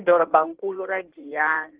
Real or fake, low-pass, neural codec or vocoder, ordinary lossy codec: fake; 3.6 kHz; codec, 16 kHz in and 24 kHz out, 1.1 kbps, FireRedTTS-2 codec; Opus, 16 kbps